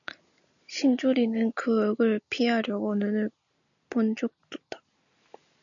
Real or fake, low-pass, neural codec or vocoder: real; 7.2 kHz; none